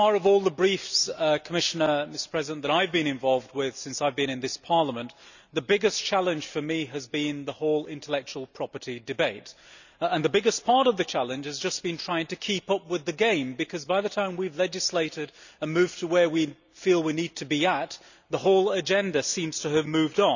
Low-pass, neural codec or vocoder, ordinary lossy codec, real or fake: 7.2 kHz; none; none; real